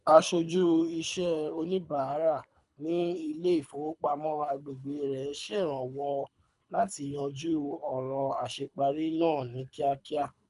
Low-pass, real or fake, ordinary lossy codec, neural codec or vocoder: 10.8 kHz; fake; none; codec, 24 kHz, 3 kbps, HILCodec